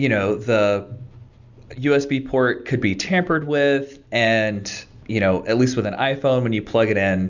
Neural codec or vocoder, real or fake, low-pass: none; real; 7.2 kHz